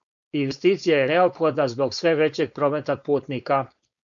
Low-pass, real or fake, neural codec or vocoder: 7.2 kHz; fake; codec, 16 kHz, 4.8 kbps, FACodec